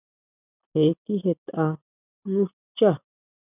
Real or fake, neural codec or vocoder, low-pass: real; none; 3.6 kHz